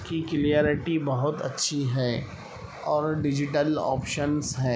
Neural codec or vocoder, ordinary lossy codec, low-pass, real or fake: none; none; none; real